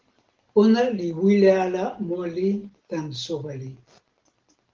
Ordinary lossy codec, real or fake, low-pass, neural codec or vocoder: Opus, 16 kbps; real; 7.2 kHz; none